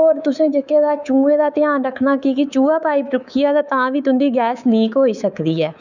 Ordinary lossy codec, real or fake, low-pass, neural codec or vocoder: none; fake; 7.2 kHz; codec, 24 kHz, 3.1 kbps, DualCodec